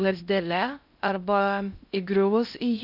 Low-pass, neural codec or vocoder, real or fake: 5.4 kHz; codec, 16 kHz in and 24 kHz out, 0.8 kbps, FocalCodec, streaming, 65536 codes; fake